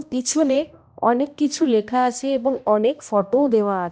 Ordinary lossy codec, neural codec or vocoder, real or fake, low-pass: none; codec, 16 kHz, 1 kbps, X-Codec, HuBERT features, trained on balanced general audio; fake; none